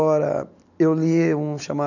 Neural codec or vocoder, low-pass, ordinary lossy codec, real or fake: none; 7.2 kHz; none; real